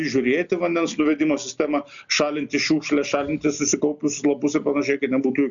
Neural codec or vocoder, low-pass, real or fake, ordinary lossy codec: none; 7.2 kHz; real; MP3, 96 kbps